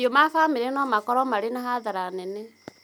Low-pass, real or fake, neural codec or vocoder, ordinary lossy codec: none; real; none; none